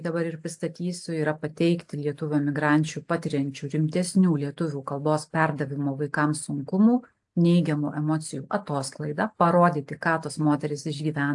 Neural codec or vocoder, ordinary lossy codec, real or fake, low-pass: none; AAC, 64 kbps; real; 10.8 kHz